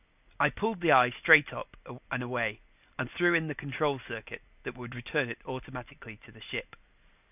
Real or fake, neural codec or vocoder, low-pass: real; none; 3.6 kHz